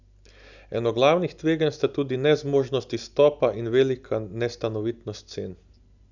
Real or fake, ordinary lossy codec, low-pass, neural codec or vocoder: real; none; 7.2 kHz; none